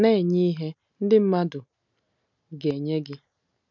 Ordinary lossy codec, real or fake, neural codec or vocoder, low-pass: none; real; none; 7.2 kHz